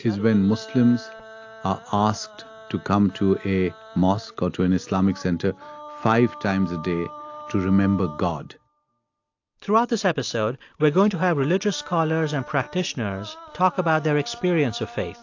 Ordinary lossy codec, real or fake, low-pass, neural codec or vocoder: AAC, 48 kbps; real; 7.2 kHz; none